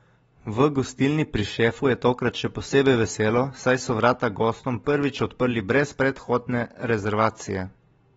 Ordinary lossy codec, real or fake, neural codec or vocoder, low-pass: AAC, 24 kbps; real; none; 14.4 kHz